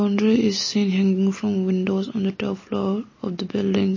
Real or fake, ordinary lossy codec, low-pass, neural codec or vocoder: real; MP3, 32 kbps; 7.2 kHz; none